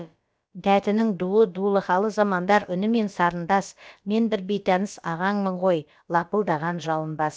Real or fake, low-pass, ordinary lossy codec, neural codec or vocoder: fake; none; none; codec, 16 kHz, about 1 kbps, DyCAST, with the encoder's durations